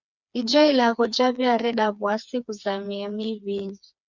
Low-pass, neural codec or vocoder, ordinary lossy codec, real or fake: 7.2 kHz; codec, 16 kHz, 4 kbps, FreqCodec, smaller model; Opus, 64 kbps; fake